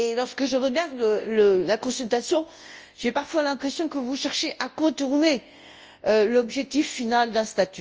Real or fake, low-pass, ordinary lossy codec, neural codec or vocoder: fake; 7.2 kHz; Opus, 24 kbps; codec, 24 kHz, 0.9 kbps, WavTokenizer, large speech release